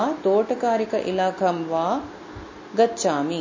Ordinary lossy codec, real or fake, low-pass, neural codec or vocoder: MP3, 32 kbps; real; 7.2 kHz; none